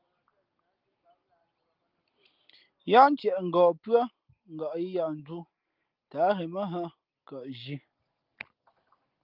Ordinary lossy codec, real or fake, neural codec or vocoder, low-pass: Opus, 32 kbps; real; none; 5.4 kHz